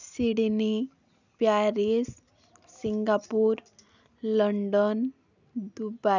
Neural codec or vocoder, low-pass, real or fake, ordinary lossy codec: none; 7.2 kHz; real; none